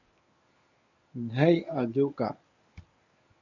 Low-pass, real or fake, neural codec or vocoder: 7.2 kHz; fake; codec, 24 kHz, 0.9 kbps, WavTokenizer, medium speech release version 1